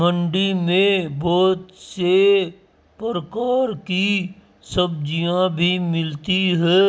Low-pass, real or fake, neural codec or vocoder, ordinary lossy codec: none; real; none; none